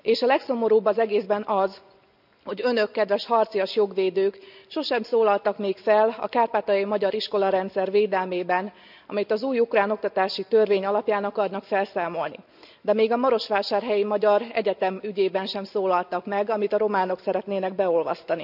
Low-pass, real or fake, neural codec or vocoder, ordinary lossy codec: 5.4 kHz; real; none; none